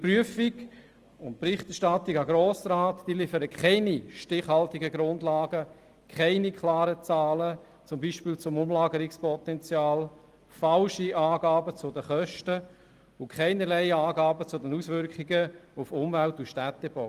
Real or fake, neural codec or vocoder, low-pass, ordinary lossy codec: real; none; 14.4 kHz; Opus, 24 kbps